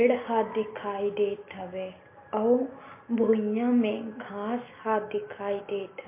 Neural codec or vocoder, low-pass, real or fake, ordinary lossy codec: none; 3.6 kHz; real; MP3, 24 kbps